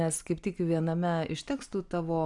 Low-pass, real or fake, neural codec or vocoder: 10.8 kHz; real; none